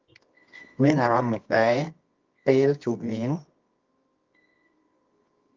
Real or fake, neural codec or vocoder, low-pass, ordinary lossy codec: fake; codec, 24 kHz, 0.9 kbps, WavTokenizer, medium music audio release; 7.2 kHz; Opus, 32 kbps